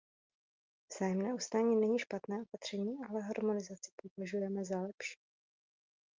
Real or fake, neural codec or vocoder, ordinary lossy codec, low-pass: real; none; Opus, 32 kbps; 7.2 kHz